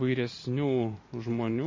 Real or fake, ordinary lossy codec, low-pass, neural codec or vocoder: fake; MP3, 32 kbps; 7.2 kHz; vocoder, 44.1 kHz, 128 mel bands every 512 samples, BigVGAN v2